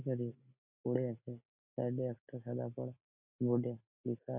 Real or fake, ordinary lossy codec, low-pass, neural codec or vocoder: real; none; 3.6 kHz; none